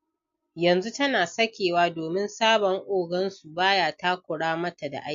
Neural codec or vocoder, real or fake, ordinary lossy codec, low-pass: none; real; MP3, 48 kbps; 7.2 kHz